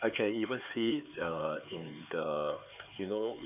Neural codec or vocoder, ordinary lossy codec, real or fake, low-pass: codec, 16 kHz, 4 kbps, X-Codec, HuBERT features, trained on LibriSpeech; none; fake; 3.6 kHz